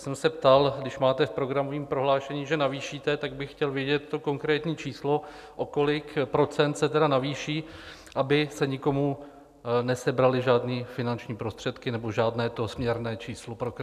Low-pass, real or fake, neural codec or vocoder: 14.4 kHz; real; none